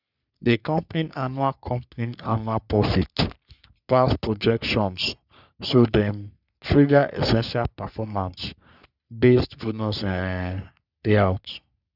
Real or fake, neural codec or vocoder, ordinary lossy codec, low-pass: fake; codec, 44.1 kHz, 3.4 kbps, Pupu-Codec; none; 5.4 kHz